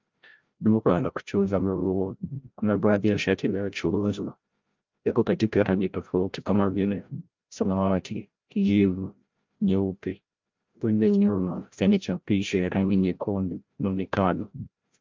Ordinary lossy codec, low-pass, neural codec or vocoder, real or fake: Opus, 32 kbps; 7.2 kHz; codec, 16 kHz, 0.5 kbps, FreqCodec, larger model; fake